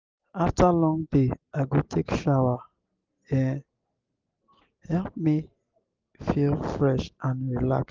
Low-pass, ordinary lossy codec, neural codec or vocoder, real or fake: 7.2 kHz; Opus, 24 kbps; none; real